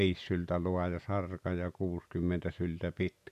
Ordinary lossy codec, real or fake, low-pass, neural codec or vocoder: none; real; 14.4 kHz; none